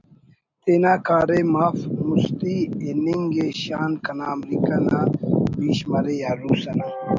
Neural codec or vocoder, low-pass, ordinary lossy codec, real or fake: none; 7.2 kHz; MP3, 64 kbps; real